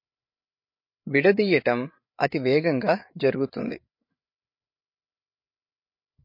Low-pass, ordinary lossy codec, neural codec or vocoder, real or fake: 5.4 kHz; MP3, 32 kbps; codec, 16 kHz, 8 kbps, FreqCodec, larger model; fake